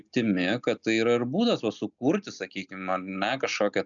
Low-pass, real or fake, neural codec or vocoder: 7.2 kHz; real; none